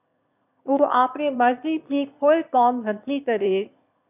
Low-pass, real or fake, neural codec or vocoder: 3.6 kHz; fake; autoencoder, 22.05 kHz, a latent of 192 numbers a frame, VITS, trained on one speaker